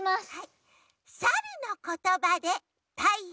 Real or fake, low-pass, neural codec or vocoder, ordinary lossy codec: real; none; none; none